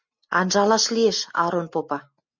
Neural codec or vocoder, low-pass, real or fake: none; 7.2 kHz; real